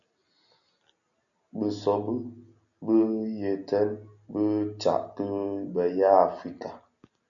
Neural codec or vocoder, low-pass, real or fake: none; 7.2 kHz; real